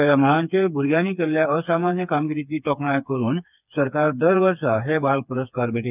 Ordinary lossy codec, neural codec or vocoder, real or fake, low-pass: none; codec, 16 kHz, 4 kbps, FreqCodec, smaller model; fake; 3.6 kHz